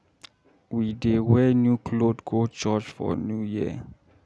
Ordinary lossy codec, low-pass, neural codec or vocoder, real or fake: none; none; none; real